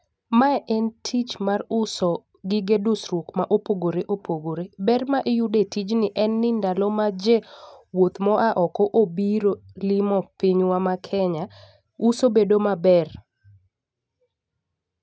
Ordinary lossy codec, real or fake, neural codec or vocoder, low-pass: none; real; none; none